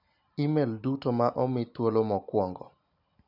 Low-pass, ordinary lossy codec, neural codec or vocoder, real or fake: 5.4 kHz; none; none; real